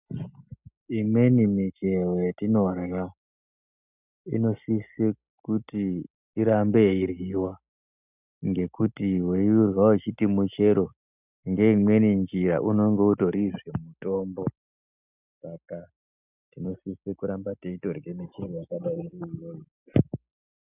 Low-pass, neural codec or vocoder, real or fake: 3.6 kHz; none; real